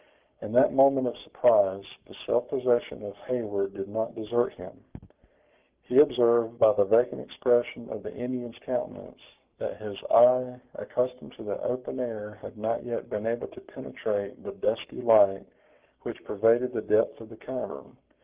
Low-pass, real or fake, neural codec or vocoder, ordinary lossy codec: 3.6 kHz; fake; codec, 44.1 kHz, 7.8 kbps, Pupu-Codec; Opus, 24 kbps